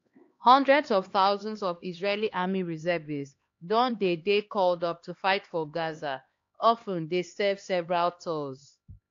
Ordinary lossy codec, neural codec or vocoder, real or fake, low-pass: AAC, 48 kbps; codec, 16 kHz, 2 kbps, X-Codec, HuBERT features, trained on LibriSpeech; fake; 7.2 kHz